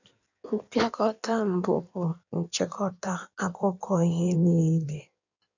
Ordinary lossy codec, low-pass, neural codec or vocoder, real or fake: none; 7.2 kHz; codec, 16 kHz in and 24 kHz out, 1.1 kbps, FireRedTTS-2 codec; fake